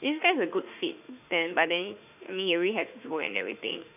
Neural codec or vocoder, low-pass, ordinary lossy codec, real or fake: autoencoder, 48 kHz, 32 numbers a frame, DAC-VAE, trained on Japanese speech; 3.6 kHz; none; fake